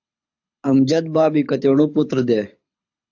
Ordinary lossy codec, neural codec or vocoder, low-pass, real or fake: AAC, 48 kbps; codec, 24 kHz, 6 kbps, HILCodec; 7.2 kHz; fake